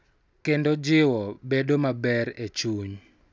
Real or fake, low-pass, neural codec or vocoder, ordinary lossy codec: real; none; none; none